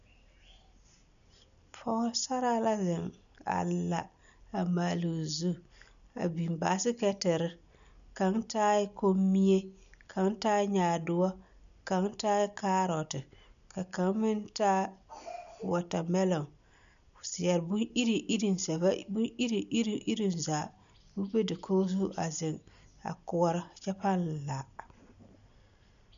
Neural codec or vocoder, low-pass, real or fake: none; 7.2 kHz; real